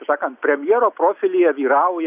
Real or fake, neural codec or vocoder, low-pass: real; none; 3.6 kHz